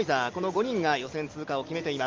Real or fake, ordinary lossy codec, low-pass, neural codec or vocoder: real; Opus, 32 kbps; 7.2 kHz; none